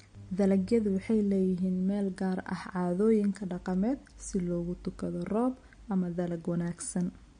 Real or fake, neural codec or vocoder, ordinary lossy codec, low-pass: real; none; MP3, 48 kbps; 9.9 kHz